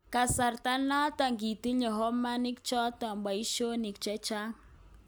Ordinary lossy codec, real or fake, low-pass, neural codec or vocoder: none; real; none; none